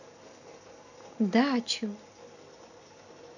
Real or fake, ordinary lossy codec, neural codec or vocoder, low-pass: real; none; none; 7.2 kHz